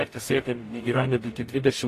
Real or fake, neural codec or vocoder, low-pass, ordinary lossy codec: fake; codec, 44.1 kHz, 0.9 kbps, DAC; 14.4 kHz; AAC, 48 kbps